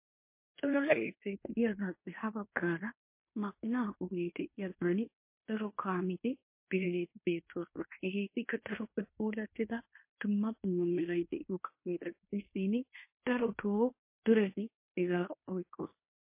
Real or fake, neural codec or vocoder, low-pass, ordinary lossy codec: fake; codec, 16 kHz in and 24 kHz out, 0.9 kbps, LongCat-Audio-Codec, fine tuned four codebook decoder; 3.6 kHz; MP3, 24 kbps